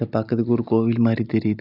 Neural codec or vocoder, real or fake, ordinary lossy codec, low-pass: none; real; none; 5.4 kHz